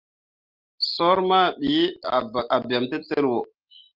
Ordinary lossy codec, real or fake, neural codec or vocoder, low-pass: Opus, 24 kbps; real; none; 5.4 kHz